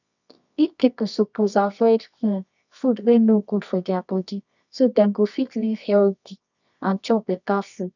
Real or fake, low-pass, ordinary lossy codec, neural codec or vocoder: fake; 7.2 kHz; none; codec, 24 kHz, 0.9 kbps, WavTokenizer, medium music audio release